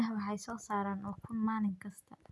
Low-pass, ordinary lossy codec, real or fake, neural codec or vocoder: none; none; real; none